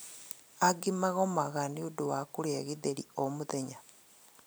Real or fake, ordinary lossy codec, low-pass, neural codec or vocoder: real; none; none; none